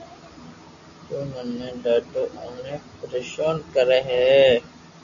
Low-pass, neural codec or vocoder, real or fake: 7.2 kHz; none; real